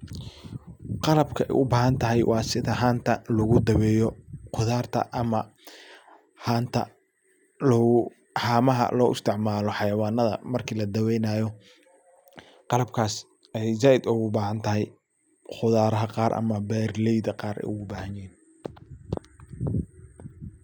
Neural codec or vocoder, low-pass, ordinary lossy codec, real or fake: none; none; none; real